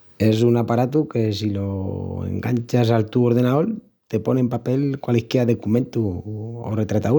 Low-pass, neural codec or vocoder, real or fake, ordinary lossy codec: 19.8 kHz; none; real; none